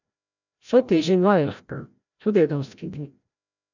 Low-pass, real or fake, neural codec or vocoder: 7.2 kHz; fake; codec, 16 kHz, 0.5 kbps, FreqCodec, larger model